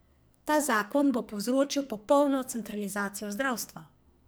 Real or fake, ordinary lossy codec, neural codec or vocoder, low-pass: fake; none; codec, 44.1 kHz, 2.6 kbps, SNAC; none